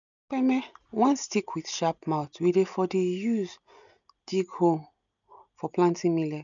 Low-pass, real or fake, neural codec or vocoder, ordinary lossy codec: 7.2 kHz; real; none; none